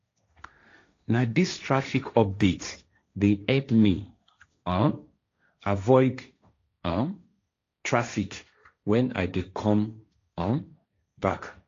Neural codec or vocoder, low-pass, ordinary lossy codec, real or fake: codec, 16 kHz, 1.1 kbps, Voila-Tokenizer; 7.2 kHz; AAC, 48 kbps; fake